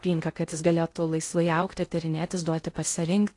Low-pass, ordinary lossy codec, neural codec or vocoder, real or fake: 10.8 kHz; AAC, 48 kbps; codec, 16 kHz in and 24 kHz out, 0.6 kbps, FocalCodec, streaming, 2048 codes; fake